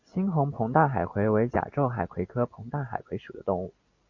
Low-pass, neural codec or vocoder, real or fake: 7.2 kHz; none; real